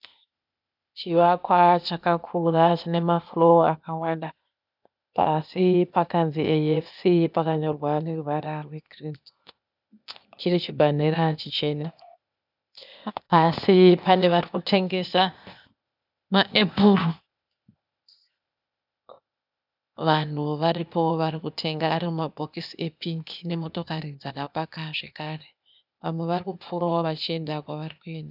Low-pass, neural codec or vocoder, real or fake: 5.4 kHz; codec, 16 kHz, 0.8 kbps, ZipCodec; fake